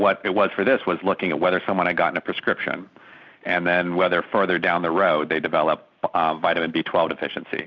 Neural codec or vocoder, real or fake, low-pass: none; real; 7.2 kHz